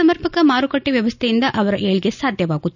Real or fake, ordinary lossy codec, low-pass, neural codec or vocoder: real; none; 7.2 kHz; none